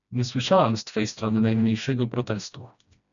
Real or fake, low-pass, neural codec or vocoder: fake; 7.2 kHz; codec, 16 kHz, 1 kbps, FreqCodec, smaller model